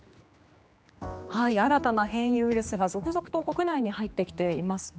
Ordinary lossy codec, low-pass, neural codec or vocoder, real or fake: none; none; codec, 16 kHz, 2 kbps, X-Codec, HuBERT features, trained on general audio; fake